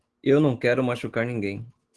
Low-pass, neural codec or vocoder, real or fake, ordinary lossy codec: 10.8 kHz; autoencoder, 48 kHz, 128 numbers a frame, DAC-VAE, trained on Japanese speech; fake; Opus, 16 kbps